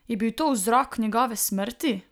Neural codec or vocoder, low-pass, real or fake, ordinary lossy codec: none; none; real; none